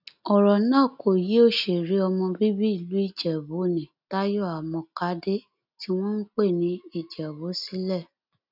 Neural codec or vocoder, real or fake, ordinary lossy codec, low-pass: none; real; none; 5.4 kHz